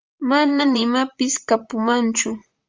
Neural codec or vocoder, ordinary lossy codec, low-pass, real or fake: vocoder, 44.1 kHz, 128 mel bands, Pupu-Vocoder; Opus, 32 kbps; 7.2 kHz; fake